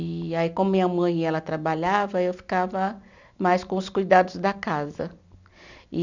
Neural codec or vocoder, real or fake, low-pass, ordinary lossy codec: none; real; 7.2 kHz; none